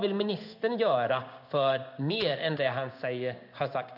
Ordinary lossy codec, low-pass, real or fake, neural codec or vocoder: none; 5.4 kHz; fake; codec, 16 kHz in and 24 kHz out, 1 kbps, XY-Tokenizer